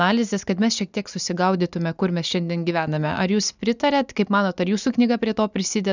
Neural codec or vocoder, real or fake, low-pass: none; real; 7.2 kHz